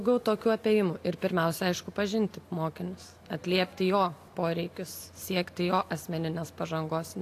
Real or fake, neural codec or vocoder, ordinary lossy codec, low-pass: real; none; AAC, 64 kbps; 14.4 kHz